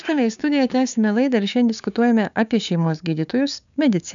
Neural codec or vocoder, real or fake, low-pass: codec, 16 kHz, 6 kbps, DAC; fake; 7.2 kHz